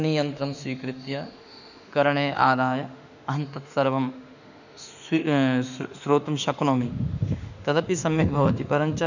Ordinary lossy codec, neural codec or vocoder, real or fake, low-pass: none; autoencoder, 48 kHz, 32 numbers a frame, DAC-VAE, trained on Japanese speech; fake; 7.2 kHz